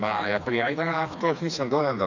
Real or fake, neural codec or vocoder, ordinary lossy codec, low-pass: fake; codec, 16 kHz, 2 kbps, FreqCodec, smaller model; none; 7.2 kHz